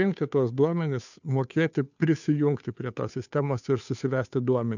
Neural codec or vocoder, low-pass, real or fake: codec, 16 kHz, 2 kbps, FunCodec, trained on Chinese and English, 25 frames a second; 7.2 kHz; fake